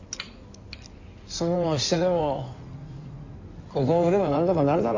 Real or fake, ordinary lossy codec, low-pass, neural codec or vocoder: fake; AAC, 48 kbps; 7.2 kHz; codec, 16 kHz in and 24 kHz out, 2.2 kbps, FireRedTTS-2 codec